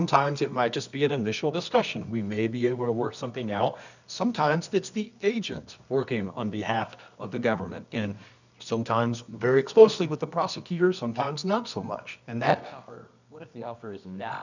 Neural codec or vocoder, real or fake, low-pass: codec, 24 kHz, 0.9 kbps, WavTokenizer, medium music audio release; fake; 7.2 kHz